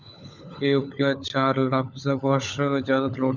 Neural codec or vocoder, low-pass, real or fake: codec, 16 kHz, 16 kbps, FunCodec, trained on LibriTTS, 50 frames a second; 7.2 kHz; fake